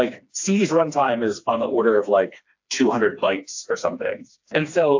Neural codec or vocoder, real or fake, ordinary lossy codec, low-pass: codec, 16 kHz, 2 kbps, FreqCodec, smaller model; fake; AAC, 48 kbps; 7.2 kHz